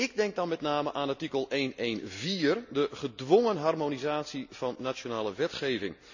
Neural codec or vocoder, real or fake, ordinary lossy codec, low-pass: none; real; none; 7.2 kHz